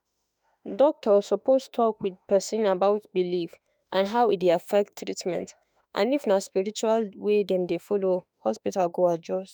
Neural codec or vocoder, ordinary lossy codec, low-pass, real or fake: autoencoder, 48 kHz, 32 numbers a frame, DAC-VAE, trained on Japanese speech; none; none; fake